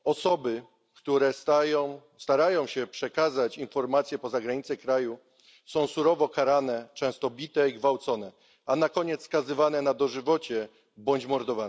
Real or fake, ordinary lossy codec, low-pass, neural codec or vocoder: real; none; none; none